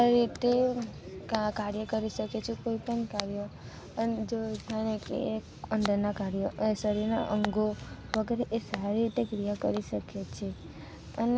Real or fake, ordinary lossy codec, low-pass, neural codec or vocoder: real; none; none; none